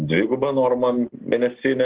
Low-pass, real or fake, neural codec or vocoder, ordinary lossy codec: 3.6 kHz; real; none; Opus, 16 kbps